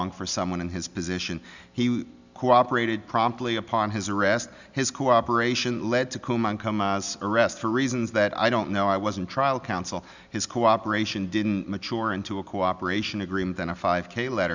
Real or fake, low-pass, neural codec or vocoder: real; 7.2 kHz; none